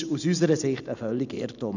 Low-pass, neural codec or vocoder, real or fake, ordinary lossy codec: 7.2 kHz; none; real; none